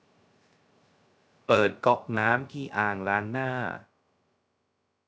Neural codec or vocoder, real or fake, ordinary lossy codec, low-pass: codec, 16 kHz, 0.3 kbps, FocalCodec; fake; none; none